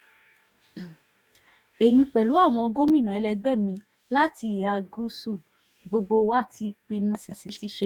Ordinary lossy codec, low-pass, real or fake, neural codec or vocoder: none; 19.8 kHz; fake; codec, 44.1 kHz, 2.6 kbps, DAC